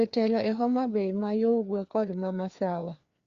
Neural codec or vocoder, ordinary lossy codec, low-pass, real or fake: codec, 16 kHz, 2 kbps, FreqCodec, larger model; Opus, 64 kbps; 7.2 kHz; fake